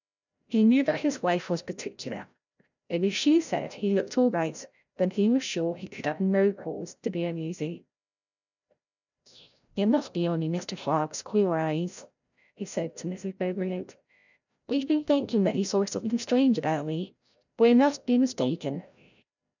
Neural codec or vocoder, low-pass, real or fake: codec, 16 kHz, 0.5 kbps, FreqCodec, larger model; 7.2 kHz; fake